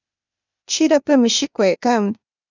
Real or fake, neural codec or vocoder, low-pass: fake; codec, 16 kHz, 0.8 kbps, ZipCodec; 7.2 kHz